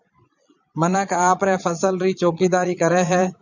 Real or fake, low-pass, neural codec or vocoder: fake; 7.2 kHz; vocoder, 22.05 kHz, 80 mel bands, Vocos